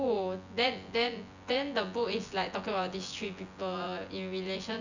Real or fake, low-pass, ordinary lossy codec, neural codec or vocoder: fake; 7.2 kHz; none; vocoder, 24 kHz, 100 mel bands, Vocos